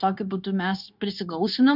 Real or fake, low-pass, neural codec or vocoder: fake; 5.4 kHz; codec, 16 kHz, 0.9 kbps, LongCat-Audio-Codec